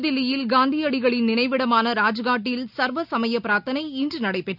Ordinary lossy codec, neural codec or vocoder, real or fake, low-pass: none; none; real; 5.4 kHz